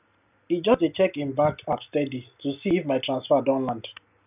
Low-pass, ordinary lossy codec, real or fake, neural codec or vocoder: 3.6 kHz; none; real; none